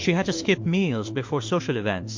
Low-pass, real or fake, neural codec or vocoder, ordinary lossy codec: 7.2 kHz; fake; autoencoder, 48 kHz, 32 numbers a frame, DAC-VAE, trained on Japanese speech; MP3, 48 kbps